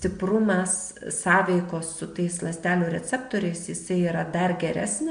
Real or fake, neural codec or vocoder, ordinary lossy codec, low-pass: real; none; MP3, 64 kbps; 9.9 kHz